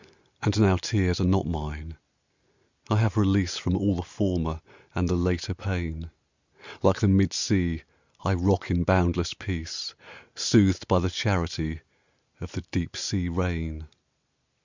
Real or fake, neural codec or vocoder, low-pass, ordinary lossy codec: real; none; 7.2 kHz; Opus, 64 kbps